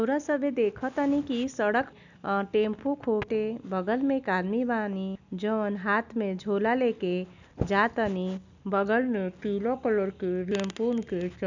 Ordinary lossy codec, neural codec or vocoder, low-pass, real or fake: none; none; 7.2 kHz; real